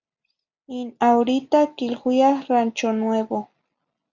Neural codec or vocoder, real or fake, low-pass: none; real; 7.2 kHz